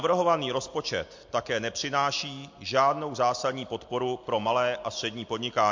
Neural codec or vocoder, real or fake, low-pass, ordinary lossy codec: none; real; 7.2 kHz; MP3, 48 kbps